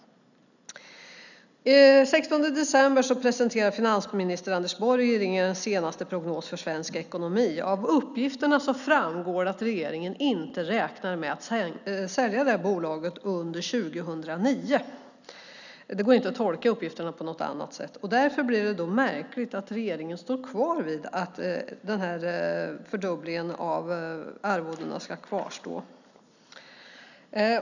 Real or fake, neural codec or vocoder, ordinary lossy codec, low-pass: real; none; none; 7.2 kHz